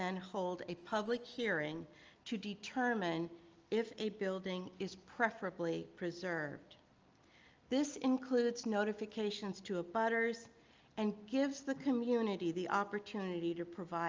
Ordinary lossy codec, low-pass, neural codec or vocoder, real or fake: Opus, 24 kbps; 7.2 kHz; none; real